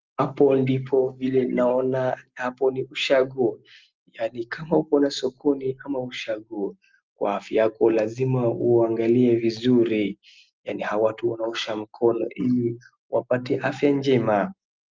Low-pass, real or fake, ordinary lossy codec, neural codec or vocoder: 7.2 kHz; real; Opus, 24 kbps; none